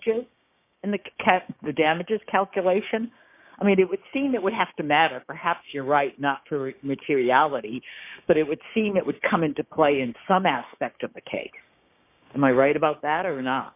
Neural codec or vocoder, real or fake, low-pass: codec, 16 kHz, 6 kbps, DAC; fake; 3.6 kHz